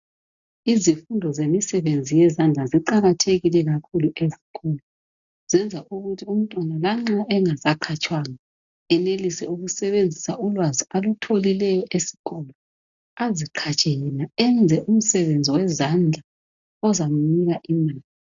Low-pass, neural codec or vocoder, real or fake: 7.2 kHz; none; real